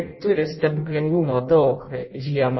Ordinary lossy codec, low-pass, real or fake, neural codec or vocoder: MP3, 24 kbps; 7.2 kHz; fake; codec, 16 kHz in and 24 kHz out, 0.6 kbps, FireRedTTS-2 codec